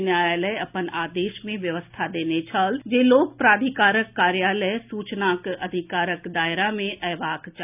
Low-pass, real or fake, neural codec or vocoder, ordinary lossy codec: 3.6 kHz; real; none; none